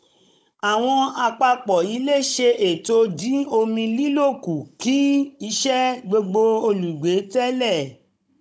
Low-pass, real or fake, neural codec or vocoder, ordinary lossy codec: none; fake; codec, 16 kHz, 16 kbps, FunCodec, trained on Chinese and English, 50 frames a second; none